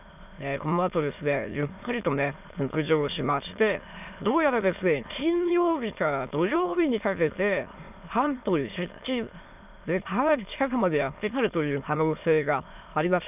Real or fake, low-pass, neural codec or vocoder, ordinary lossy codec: fake; 3.6 kHz; autoencoder, 22.05 kHz, a latent of 192 numbers a frame, VITS, trained on many speakers; none